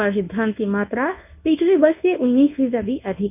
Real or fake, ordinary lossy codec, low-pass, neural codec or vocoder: fake; none; 3.6 kHz; codec, 24 kHz, 0.9 kbps, WavTokenizer, medium speech release version 1